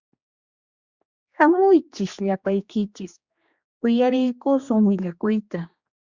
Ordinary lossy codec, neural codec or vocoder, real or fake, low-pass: Opus, 64 kbps; codec, 16 kHz, 2 kbps, X-Codec, HuBERT features, trained on general audio; fake; 7.2 kHz